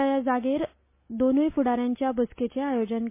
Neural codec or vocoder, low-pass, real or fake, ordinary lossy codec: none; 3.6 kHz; real; MP3, 24 kbps